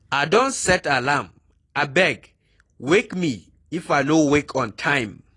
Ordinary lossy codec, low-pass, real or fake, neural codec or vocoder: AAC, 32 kbps; 10.8 kHz; real; none